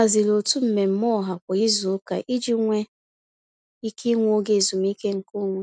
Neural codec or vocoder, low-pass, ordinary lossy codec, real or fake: none; 9.9 kHz; none; real